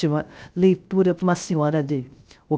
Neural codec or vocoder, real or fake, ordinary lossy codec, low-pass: codec, 16 kHz, 0.3 kbps, FocalCodec; fake; none; none